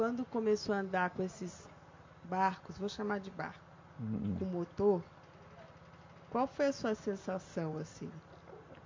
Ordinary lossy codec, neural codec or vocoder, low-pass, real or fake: MP3, 48 kbps; none; 7.2 kHz; real